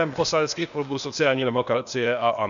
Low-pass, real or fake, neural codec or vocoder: 7.2 kHz; fake; codec, 16 kHz, 0.8 kbps, ZipCodec